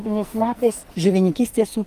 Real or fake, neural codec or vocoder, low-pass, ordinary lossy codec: fake; codec, 44.1 kHz, 2.6 kbps, SNAC; 14.4 kHz; Opus, 32 kbps